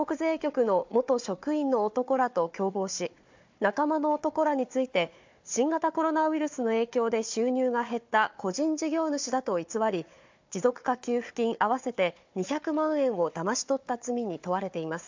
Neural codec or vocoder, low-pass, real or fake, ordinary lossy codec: codec, 16 kHz, 4 kbps, FunCodec, trained on Chinese and English, 50 frames a second; 7.2 kHz; fake; MP3, 64 kbps